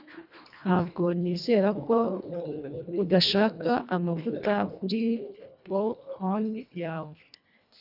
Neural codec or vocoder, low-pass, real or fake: codec, 24 kHz, 1.5 kbps, HILCodec; 5.4 kHz; fake